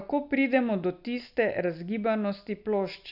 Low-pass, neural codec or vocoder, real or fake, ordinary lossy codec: 5.4 kHz; none; real; none